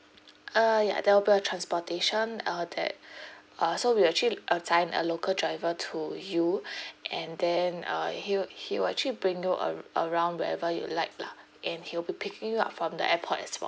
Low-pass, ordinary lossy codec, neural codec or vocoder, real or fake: none; none; none; real